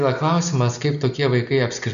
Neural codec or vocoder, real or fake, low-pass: none; real; 7.2 kHz